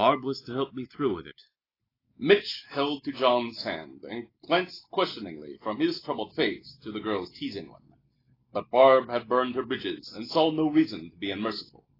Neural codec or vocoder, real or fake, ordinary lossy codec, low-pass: none; real; AAC, 24 kbps; 5.4 kHz